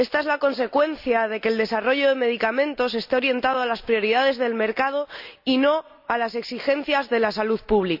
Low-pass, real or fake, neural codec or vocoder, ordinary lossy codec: 5.4 kHz; real; none; none